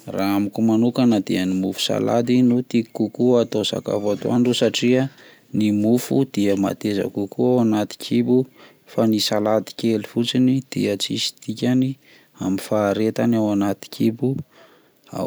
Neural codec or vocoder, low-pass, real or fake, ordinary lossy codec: none; none; real; none